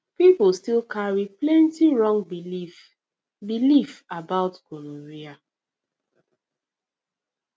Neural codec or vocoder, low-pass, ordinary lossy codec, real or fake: none; none; none; real